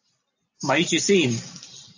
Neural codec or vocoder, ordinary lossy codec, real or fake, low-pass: none; AAC, 48 kbps; real; 7.2 kHz